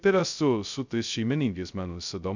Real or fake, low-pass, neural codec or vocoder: fake; 7.2 kHz; codec, 16 kHz, 0.2 kbps, FocalCodec